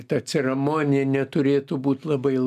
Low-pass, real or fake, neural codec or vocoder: 14.4 kHz; real; none